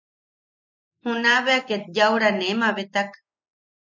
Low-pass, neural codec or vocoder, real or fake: 7.2 kHz; none; real